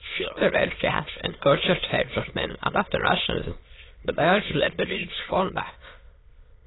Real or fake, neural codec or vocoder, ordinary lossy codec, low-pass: fake; autoencoder, 22.05 kHz, a latent of 192 numbers a frame, VITS, trained on many speakers; AAC, 16 kbps; 7.2 kHz